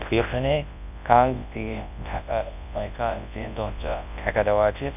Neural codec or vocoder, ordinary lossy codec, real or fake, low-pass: codec, 24 kHz, 0.9 kbps, WavTokenizer, large speech release; none; fake; 3.6 kHz